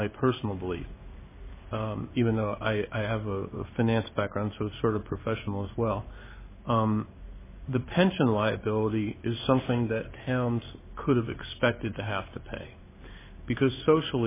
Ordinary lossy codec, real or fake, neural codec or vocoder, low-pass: MP3, 16 kbps; fake; codec, 16 kHz in and 24 kHz out, 1 kbps, XY-Tokenizer; 3.6 kHz